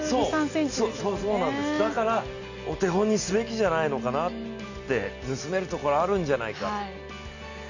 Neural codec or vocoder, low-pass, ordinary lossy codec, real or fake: none; 7.2 kHz; none; real